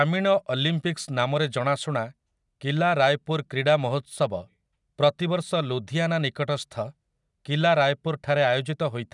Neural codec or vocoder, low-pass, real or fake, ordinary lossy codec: none; 10.8 kHz; real; none